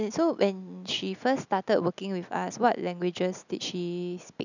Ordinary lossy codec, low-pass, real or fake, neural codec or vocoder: none; 7.2 kHz; real; none